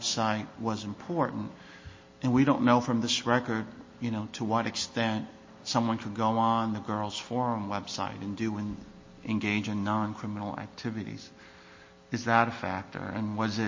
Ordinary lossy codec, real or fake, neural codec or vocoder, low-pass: MP3, 32 kbps; real; none; 7.2 kHz